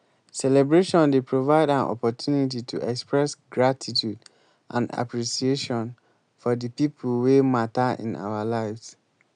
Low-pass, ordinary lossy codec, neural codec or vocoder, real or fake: 9.9 kHz; none; none; real